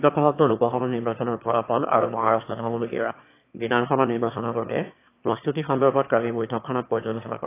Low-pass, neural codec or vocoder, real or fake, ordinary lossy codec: 3.6 kHz; autoencoder, 22.05 kHz, a latent of 192 numbers a frame, VITS, trained on one speaker; fake; AAC, 24 kbps